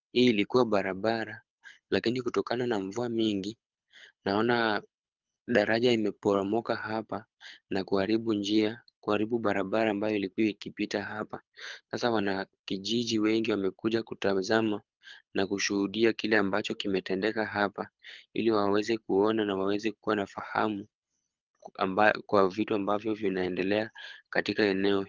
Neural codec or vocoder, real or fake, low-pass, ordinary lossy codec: codec, 24 kHz, 6 kbps, HILCodec; fake; 7.2 kHz; Opus, 24 kbps